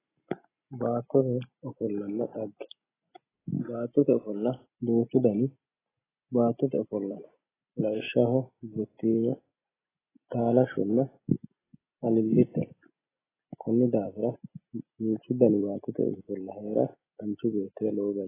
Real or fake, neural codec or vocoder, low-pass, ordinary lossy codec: real; none; 3.6 kHz; AAC, 16 kbps